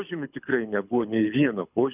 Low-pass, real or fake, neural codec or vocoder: 3.6 kHz; fake; codec, 24 kHz, 6 kbps, HILCodec